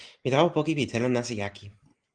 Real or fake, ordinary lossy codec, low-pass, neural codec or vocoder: real; Opus, 24 kbps; 9.9 kHz; none